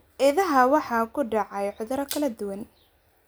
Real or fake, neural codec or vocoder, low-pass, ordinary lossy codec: real; none; none; none